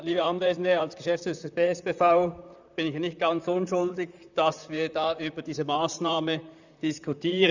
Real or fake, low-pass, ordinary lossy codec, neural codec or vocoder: fake; 7.2 kHz; none; vocoder, 44.1 kHz, 128 mel bands, Pupu-Vocoder